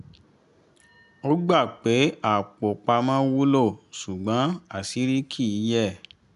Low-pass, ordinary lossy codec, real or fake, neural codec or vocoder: 10.8 kHz; none; real; none